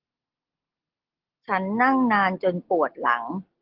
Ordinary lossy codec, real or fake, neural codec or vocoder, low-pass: Opus, 16 kbps; real; none; 5.4 kHz